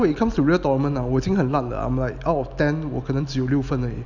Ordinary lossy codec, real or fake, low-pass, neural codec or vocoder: none; real; 7.2 kHz; none